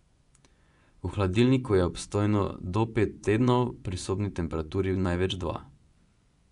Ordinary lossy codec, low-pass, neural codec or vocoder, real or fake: none; 10.8 kHz; vocoder, 24 kHz, 100 mel bands, Vocos; fake